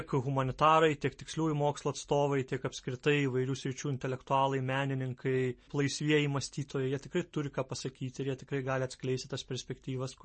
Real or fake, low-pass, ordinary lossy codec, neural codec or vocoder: real; 10.8 kHz; MP3, 32 kbps; none